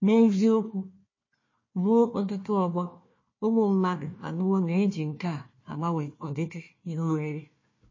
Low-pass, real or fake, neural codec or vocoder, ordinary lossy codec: 7.2 kHz; fake; codec, 16 kHz, 1 kbps, FunCodec, trained on Chinese and English, 50 frames a second; MP3, 32 kbps